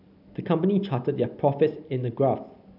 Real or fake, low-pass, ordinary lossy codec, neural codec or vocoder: real; 5.4 kHz; none; none